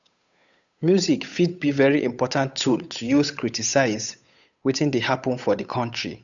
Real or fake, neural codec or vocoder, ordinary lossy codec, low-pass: fake; codec, 16 kHz, 8 kbps, FunCodec, trained on Chinese and English, 25 frames a second; none; 7.2 kHz